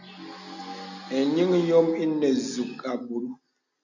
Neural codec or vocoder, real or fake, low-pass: none; real; 7.2 kHz